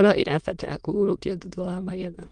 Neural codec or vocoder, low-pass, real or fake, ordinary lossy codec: autoencoder, 22.05 kHz, a latent of 192 numbers a frame, VITS, trained on many speakers; 9.9 kHz; fake; Opus, 32 kbps